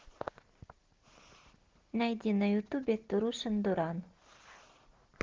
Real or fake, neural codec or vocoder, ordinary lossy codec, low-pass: real; none; Opus, 16 kbps; 7.2 kHz